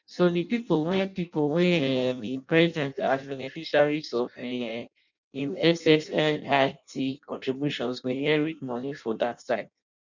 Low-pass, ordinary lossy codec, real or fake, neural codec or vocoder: 7.2 kHz; none; fake; codec, 16 kHz in and 24 kHz out, 0.6 kbps, FireRedTTS-2 codec